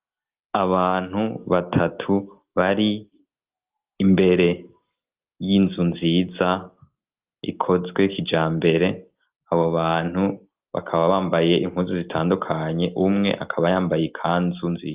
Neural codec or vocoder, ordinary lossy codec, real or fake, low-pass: none; Opus, 32 kbps; real; 3.6 kHz